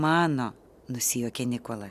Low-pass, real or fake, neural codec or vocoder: 14.4 kHz; real; none